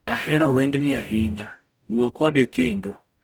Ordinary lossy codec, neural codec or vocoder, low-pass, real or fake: none; codec, 44.1 kHz, 0.9 kbps, DAC; none; fake